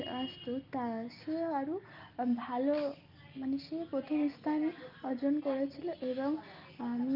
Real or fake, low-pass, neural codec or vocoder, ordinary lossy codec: real; 5.4 kHz; none; Opus, 32 kbps